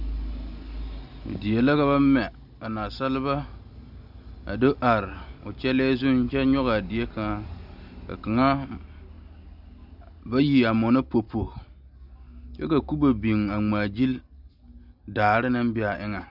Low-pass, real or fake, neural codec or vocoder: 5.4 kHz; real; none